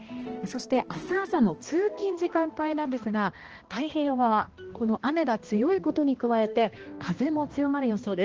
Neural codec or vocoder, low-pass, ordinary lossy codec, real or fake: codec, 16 kHz, 1 kbps, X-Codec, HuBERT features, trained on balanced general audio; 7.2 kHz; Opus, 16 kbps; fake